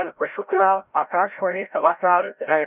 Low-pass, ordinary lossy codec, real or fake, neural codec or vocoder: 3.6 kHz; none; fake; codec, 16 kHz, 0.5 kbps, FreqCodec, larger model